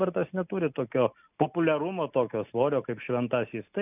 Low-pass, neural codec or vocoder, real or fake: 3.6 kHz; none; real